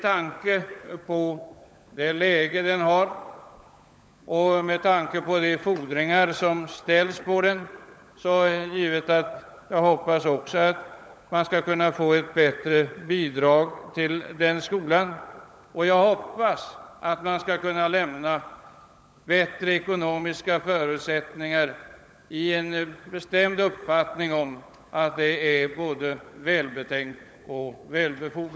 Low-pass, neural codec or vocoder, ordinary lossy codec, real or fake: none; codec, 16 kHz, 16 kbps, FunCodec, trained on LibriTTS, 50 frames a second; none; fake